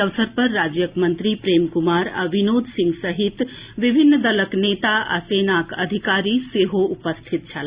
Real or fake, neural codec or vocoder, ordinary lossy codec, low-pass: real; none; Opus, 64 kbps; 3.6 kHz